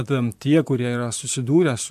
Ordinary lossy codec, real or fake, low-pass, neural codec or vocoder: MP3, 96 kbps; fake; 14.4 kHz; vocoder, 44.1 kHz, 128 mel bands, Pupu-Vocoder